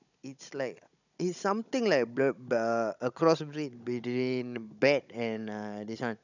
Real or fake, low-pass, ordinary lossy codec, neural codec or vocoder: fake; 7.2 kHz; none; codec, 16 kHz, 16 kbps, FunCodec, trained on Chinese and English, 50 frames a second